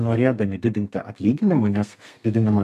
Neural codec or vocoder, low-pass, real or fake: codec, 32 kHz, 1.9 kbps, SNAC; 14.4 kHz; fake